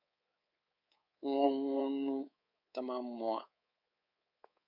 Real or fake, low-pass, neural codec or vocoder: fake; 5.4 kHz; codec, 24 kHz, 3.1 kbps, DualCodec